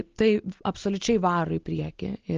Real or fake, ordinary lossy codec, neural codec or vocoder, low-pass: real; Opus, 16 kbps; none; 7.2 kHz